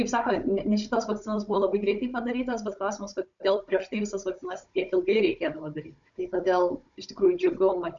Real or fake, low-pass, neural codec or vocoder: fake; 7.2 kHz; codec, 16 kHz, 16 kbps, FunCodec, trained on Chinese and English, 50 frames a second